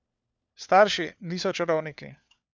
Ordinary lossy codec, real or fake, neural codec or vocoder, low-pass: none; fake; codec, 16 kHz, 4 kbps, FunCodec, trained on LibriTTS, 50 frames a second; none